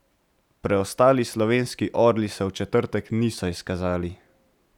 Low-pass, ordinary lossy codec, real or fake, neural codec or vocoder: 19.8 kHz; none; real; none